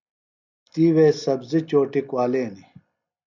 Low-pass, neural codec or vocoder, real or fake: 7.2 kHz; none; real